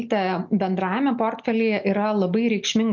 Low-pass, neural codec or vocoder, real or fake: 7.2 kHz; none; real